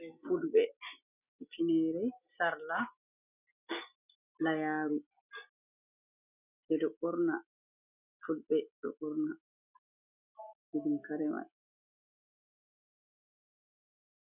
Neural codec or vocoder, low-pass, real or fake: none; 3.6 kHz; real